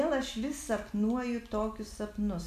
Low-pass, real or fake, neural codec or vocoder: 14.4 kHz; real; none